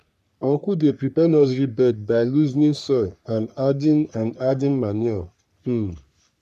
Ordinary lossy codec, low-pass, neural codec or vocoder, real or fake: none; 14.4 kHz; codec, 44.1 kHz, 3.4 kbps, Pupu-Codec; fake